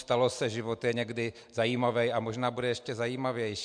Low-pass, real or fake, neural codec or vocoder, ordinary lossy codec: 9.9 kHz; real; none; MP3, 64 kbps